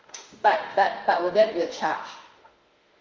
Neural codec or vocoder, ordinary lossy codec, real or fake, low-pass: autoencoder, 48 kHz, 32 numbers a frame, DAC-VAE, trained on Japanese speech; Opus, 32 kbps; fake; 7.2 kHz